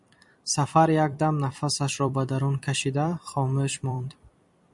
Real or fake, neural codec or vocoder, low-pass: fake; vocoder, 44.1 kHz, 128 mel bands every 256 samples, BigVGAN v2; 10.8 kHz